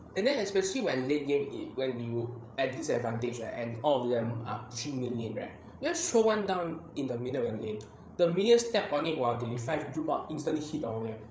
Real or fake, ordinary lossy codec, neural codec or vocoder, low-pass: fake; none; codec, 16 kHz, 8 kbps, FreqCodec, larger model; none